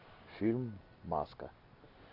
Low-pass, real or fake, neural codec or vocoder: 5.4 kHz; real; none